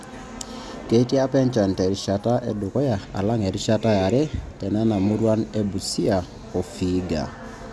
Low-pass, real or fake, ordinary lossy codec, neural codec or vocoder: none; real; none; none